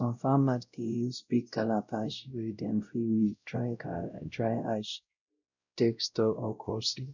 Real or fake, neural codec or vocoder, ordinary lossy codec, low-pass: fake; codec, 16 kHz, 0.5 kbps, X-Codec, WavLM features, trained on Multilingual LibriSpeech; none; 7.2 kHz